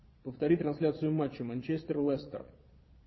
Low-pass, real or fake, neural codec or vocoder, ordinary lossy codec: 7.2 kHz; fake; vocoder, 22.05 kHz, 80 mel bands, Vocos; MP3, 24 kbps